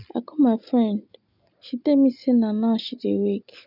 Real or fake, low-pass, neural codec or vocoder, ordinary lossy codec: real; 5.4 kHz; none; none